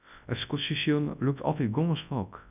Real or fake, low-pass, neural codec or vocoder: fake; 3.6 kHz; codec, 24 kHz, 0.9 kbps, WavTokenizer, large speech release